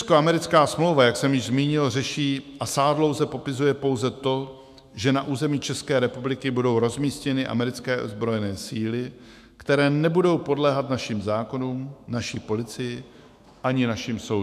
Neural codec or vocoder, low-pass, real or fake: autoencoder, 48 kHz, 128 numbers a frame, DAC-VAE, trained on Japanese speech; 14.4 kHz; fake